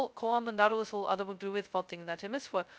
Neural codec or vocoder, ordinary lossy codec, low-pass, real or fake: codec, 16 kHz, 0.2 kbps, FocalCodec; none; none; fake